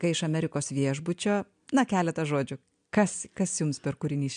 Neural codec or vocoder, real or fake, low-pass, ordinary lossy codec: none; real; 9.9 kHz; MP3, 64 kbps